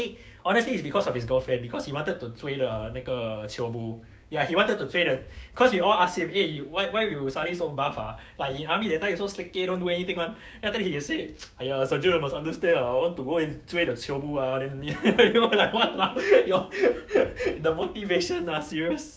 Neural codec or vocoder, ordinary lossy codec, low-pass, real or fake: codec, 16 kHz, 6 kbps, DAC; none; none; fake